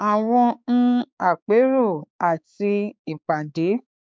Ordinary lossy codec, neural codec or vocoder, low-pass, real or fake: none; codec, 16 kHz, 4 kbps, X-Codec, HuBERT features, trained on balanced general audio; none; fake